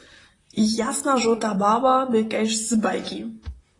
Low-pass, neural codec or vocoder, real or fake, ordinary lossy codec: 10.8 kHz; vocoder, 44.1 kHz, 128 mel bands, Pupu-Vocoder; fake; AAC, 32 kbps